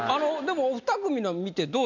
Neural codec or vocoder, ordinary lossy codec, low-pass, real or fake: none; none; 7.2 kHz; real